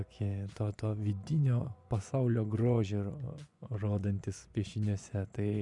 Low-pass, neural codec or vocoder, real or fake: 10.8 kHz; vocoder, 24 kHz, 100 mel bands, Vocos; fake